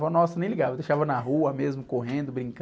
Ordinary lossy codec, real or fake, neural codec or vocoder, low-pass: none; real; none; none